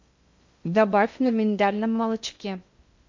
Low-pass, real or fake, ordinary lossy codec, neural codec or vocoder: 7.2 kHz; fake; MP3, 48 kbps; codec, 16 kHz in and 24 kHz out, 0.6 kbps, FocalCodec, streaming, 2048 codes